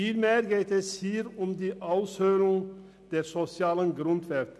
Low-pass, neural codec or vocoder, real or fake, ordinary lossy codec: none; none; real; none